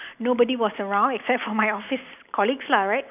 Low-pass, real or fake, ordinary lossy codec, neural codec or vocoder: 3.6 kHz; real; none; none